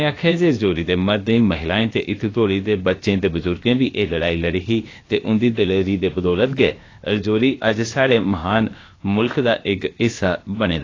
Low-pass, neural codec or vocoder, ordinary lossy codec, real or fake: 7.2 kHz; codec, 16 kHz, about 1 kbps, DyCAST, with the encoder's durations; AAC, 32 kbps; fake